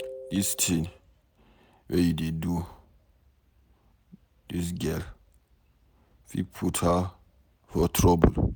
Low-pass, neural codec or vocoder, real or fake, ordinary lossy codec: none; none; real; none